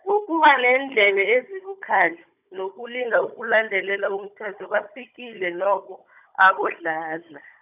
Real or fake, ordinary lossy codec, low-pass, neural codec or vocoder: fake; none; 3.6 kHz; codec, 16 kHz, 16 kbps, FunCodec, trained on Chinese and English, 50 frames a second